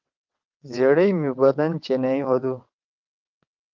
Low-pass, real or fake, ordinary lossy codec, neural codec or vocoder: 7.2 kHz; fake; Opus, 24 kbps; vocoder, 22.05 kHz, 80 mel bands, WaveNeXt